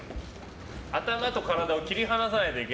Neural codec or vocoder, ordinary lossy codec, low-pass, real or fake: none; none; none; real